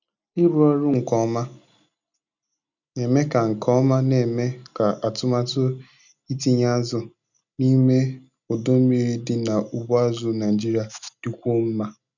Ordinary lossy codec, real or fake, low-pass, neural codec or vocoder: none; real; 7.2 kHz; none